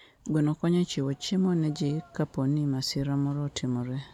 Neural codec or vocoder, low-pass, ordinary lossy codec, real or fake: none; 19.8 kHz; none; real